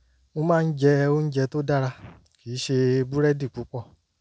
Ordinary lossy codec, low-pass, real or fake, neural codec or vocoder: none; none; real; none